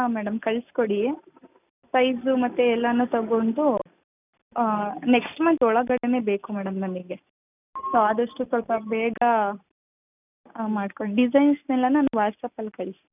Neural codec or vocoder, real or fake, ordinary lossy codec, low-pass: none; real; none; 3.6 kHz